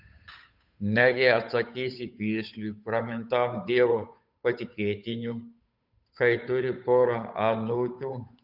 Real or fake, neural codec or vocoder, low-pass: fake; codec, 16 kHz, 8 kbps, FunCodec, trained on Chinese and English, 25 frames a second; 5.4 kHz